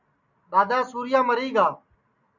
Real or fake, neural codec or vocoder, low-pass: real; none; 7.2 kHz